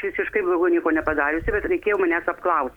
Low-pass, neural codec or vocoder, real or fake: 19.8 kHz; none; real